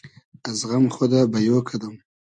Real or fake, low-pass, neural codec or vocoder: real; 9.9 kHz; none